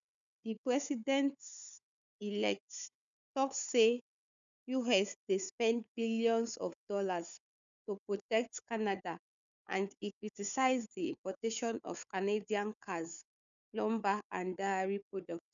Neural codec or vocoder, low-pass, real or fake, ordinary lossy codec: codec, 16 kHz, 4 kbps, FunCodec, trained on Chinese and English, 50 frames a second; 7.2 kHz; fake; none